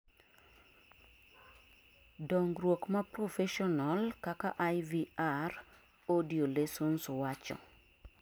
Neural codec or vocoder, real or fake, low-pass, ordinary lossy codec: none; real; none; none